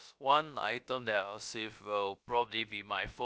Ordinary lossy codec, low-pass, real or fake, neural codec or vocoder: none; none; fake; codec, 16 kHz, about 1 kbps, DyCAST, with the encoder's durations